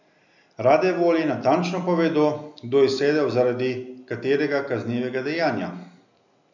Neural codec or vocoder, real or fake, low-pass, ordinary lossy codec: none; real; 7.2 kHz; none